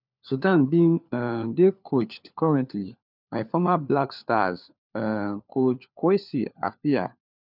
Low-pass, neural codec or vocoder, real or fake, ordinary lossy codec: 5.4 kHz; codec, 16 kHz, 4 kbps, FunCodec, trained on LibriTTS, 50 frames a second; fake; none